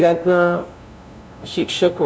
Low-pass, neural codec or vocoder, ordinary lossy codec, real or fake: none; codec, 16 kHz, 0.5 kbps, FunCodec, trained on LibriTTS, 25 frames a second; none; fake